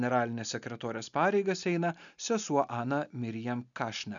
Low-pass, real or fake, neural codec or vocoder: 7.2 kHz; real; none